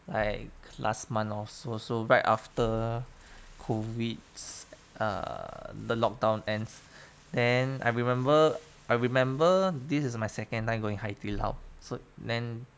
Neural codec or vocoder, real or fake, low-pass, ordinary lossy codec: none; real; none; none